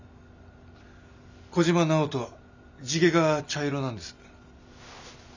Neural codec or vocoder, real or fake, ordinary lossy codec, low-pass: none; real; none; 7.2 kHz